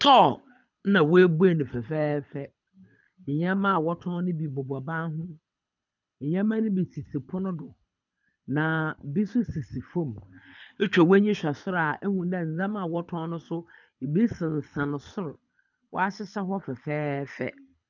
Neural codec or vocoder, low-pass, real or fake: codec, 24 kHz, 6 kbps, HILCodec; 7.2 kHz; fake